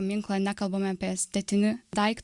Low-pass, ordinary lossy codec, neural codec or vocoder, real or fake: 10.8 kHz; Opus, 64 kbps; none; real